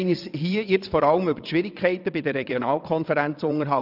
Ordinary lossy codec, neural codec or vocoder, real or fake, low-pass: none; vocoder, 22.05 kHz, 80 mel bands, WaveNeXt; fake; 5.4 kHz